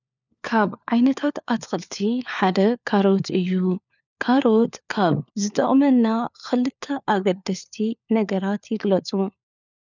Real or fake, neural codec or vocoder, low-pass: fake; codec, 16 kHz, 4 kbps, FunCodec, trained on LibriTTS, 50 frames a second; 7.2 kHz